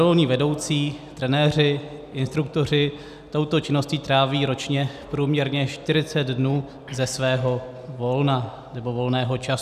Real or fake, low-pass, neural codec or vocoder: real; 14.4 kHz; none